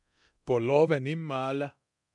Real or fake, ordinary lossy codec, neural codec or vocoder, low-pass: fake; MP3, 96 kbps; codec, 24 kHz, 0.9 kbps, DualCodec; 10.8 kHz